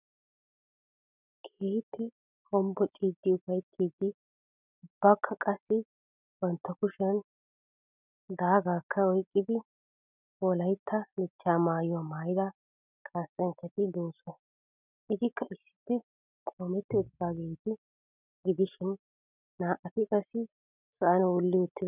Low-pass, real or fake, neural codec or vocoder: 3.6 kHz; real; none